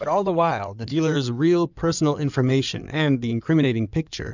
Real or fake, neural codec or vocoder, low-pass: fake; codec, 16 kHz in and 24 kHz out, 2.2 kbps, FireRedTTS-2 codec; 7.2 kHz